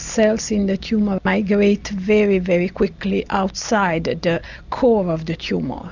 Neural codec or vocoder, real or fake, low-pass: none; real; 7.2 kHz